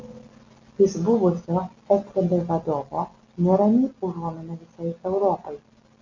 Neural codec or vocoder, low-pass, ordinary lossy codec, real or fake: none; 7.2 kHz; MP3, 64 kbps; real